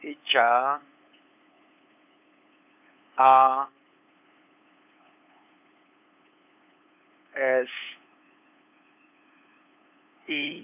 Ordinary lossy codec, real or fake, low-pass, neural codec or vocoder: none; fake; 3.6 kHz; codec, 16 kHz, 8 kbps, FunCodec, trained on LibriTTS, 25 frames a second